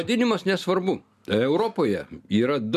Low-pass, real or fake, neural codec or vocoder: 14.4 kHz; real; none